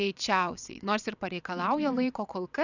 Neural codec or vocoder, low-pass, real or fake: vocoder, 22.05 kHz, 80 mel bands, WaveNeXt; 7.2 kHz; fake